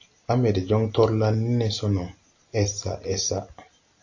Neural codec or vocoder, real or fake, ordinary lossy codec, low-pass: none; real; AAC, 48 kbps; 7.2 kHz